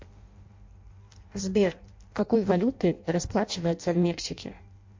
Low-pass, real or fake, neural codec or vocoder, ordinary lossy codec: 7.2 kHz; fake; codec, 16 kHz in and 24 kHz out, 0.6 kbps, FireRedTTS-2 codec; MP3, 48 kbps